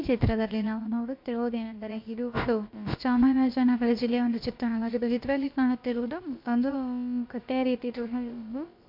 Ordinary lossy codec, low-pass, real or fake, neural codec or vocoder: MP3, 48 kbps; 5.4 kHz; fake; codec, 16 kHz, about 1 kbps, DyCAST, with the encoder's durations